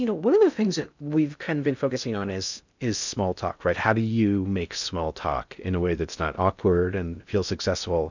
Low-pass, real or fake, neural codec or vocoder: 7.2 kHz; fake; codec, 16 kHz in and 24 kHz out, 0.6 kbps, FocalCodec, streaming, 2048 codes